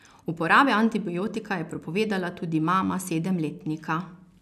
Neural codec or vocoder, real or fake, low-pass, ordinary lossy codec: none; real; 14.4 kHz; none